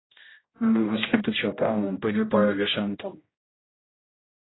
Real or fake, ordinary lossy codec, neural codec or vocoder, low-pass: fake; AAC, 16 kbps; codec, 16 kHz, 0.5 kbps, X-Codec, HuBERT features, trained on general audio; 7.2 kHz